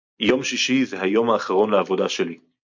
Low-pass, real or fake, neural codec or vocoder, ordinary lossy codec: 7.2 kHz; real; none; MP3, 48 kbps